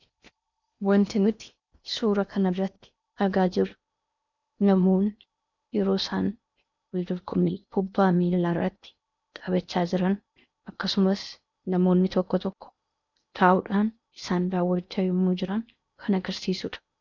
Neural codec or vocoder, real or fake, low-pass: codec, 16 kHz in and 24 kHz out, 0.8 kbps, FocalCodec, streaming, 65536 codes; fake; 7.2 kHz